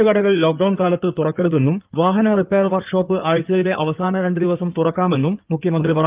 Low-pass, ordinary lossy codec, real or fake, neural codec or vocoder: 3.6 kHz; Opus, 32 kbps; fake; codec, 16 kHz in and 24 kHz out, 2.2 kbps, FireRedTTS-2 codec